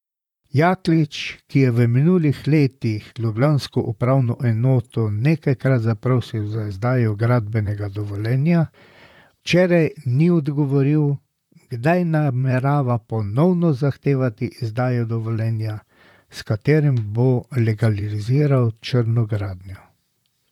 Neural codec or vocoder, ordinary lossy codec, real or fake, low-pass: vocoder, 44.1 kHz, 128 mel bands, Pupu-Vocoder; none; fake; 19.8 kHz